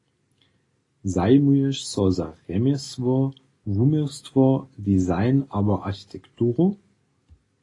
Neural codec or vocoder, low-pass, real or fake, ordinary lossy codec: none; 10.8 kHz; real; AAC, 32 kbps